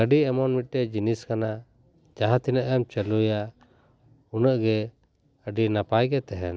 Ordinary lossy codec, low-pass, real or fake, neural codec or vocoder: none; none; real; none